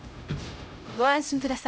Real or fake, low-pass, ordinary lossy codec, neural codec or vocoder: fake; none; none; codec, 16 kHz, 0.5 kbps, X-Codec, HuBERT features, trained on LibriSpeech